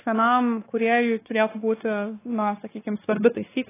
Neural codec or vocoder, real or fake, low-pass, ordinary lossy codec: codec, 16 kHz, 2 kbps, X-Codec, WavLM features, trained on Multilingual LibriSpeech; fake; 3.6 kHz; AAC, 16 kbps